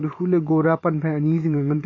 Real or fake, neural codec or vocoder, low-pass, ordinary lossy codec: fake; vocoder, 44.1 kHz, 128 mel bands every 512 samples, BigVGAN v2; 7.2 kHz; MP3, 32 kbps